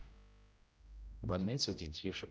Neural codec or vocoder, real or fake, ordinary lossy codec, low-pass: codec, 16 kHz, 0.5 kbps, X-Codec, HuBERT features, trained on general audio; fake; none; none